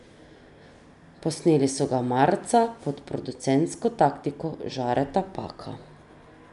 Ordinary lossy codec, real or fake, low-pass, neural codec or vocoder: none; real; 10.8 kHz; none